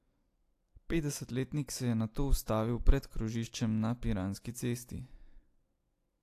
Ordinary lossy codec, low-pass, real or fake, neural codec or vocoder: AAC, 64 kbps; 14.4 kHz; real; none